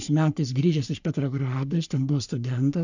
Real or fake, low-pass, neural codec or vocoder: fake; 7.2 kHz; codec, 44.1 kHz, 3.4 kbps, Pupu-Codec